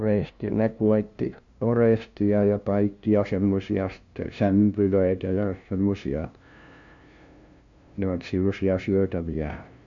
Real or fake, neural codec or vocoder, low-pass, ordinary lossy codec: fake; codec, 16 kHz, 0.5 kbps, FunCodec, trained on LibriTTS, 25 frames a second; 7.2 kHz; MP3, 64 kbps